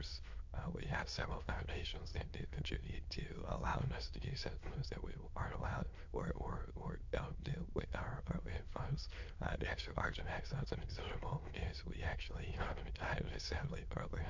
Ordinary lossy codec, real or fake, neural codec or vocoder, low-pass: MP3, 48 kbps; fake; autoencoder, 22.05 kHz, a latent of 192 numbers a frame, VITS, trained on many speakers; 7.2 kHz